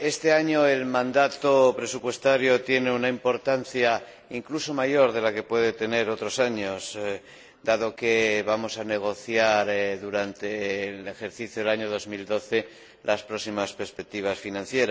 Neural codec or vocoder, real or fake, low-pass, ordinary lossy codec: none; real; none; none